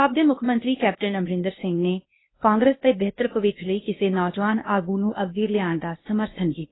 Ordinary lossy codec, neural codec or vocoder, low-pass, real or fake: AAC, 16 kbps; codec, 16 kHz, 0.8 kbps, ZipCodec; 7.2 kHz; fake